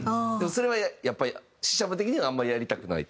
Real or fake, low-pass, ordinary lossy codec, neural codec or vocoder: real; none; none; none